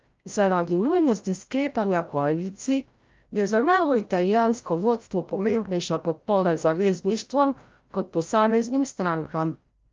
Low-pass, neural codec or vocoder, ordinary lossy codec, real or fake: 7.2 kHz; codec, 16 kHz, 0.5 kbps, FreqCodec, larger model; Opus, 24 kbps; fake